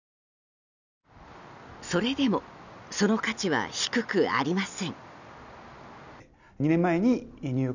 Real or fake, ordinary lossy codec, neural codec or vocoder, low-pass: real; none; none; 7.2 kHz